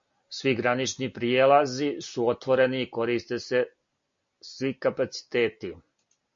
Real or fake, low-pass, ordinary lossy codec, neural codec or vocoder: real; 7.2 kHz; MP3, 48 kbps; none